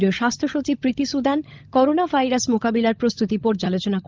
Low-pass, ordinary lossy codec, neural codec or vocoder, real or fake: 7.2 kHz; Opus, 24 kbps; codec, 16 kHz, 16 kbps, FunCodec, trained on LibriTTS, 50 frames a second; fake